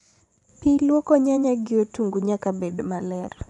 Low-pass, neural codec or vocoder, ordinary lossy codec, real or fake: 10.8 kHz; vocoder, 24 kHz, 100 mel bands, Vocos; none; fake